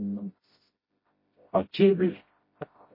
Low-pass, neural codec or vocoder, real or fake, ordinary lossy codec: 5.4 kHz; codec, 16 kHz, 0.5 kbps, FreqCodec, smaller model; fake; MP3, 24 kbps